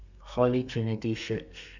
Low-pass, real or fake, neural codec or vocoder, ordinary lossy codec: 7.2 kHz; fake; codec, 32 kHz, 1.9 kbps, SNAC; none